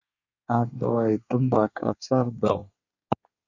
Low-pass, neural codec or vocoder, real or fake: 7.2 kHz; codec, 24 kHz, 1 kbps, SNAC; fake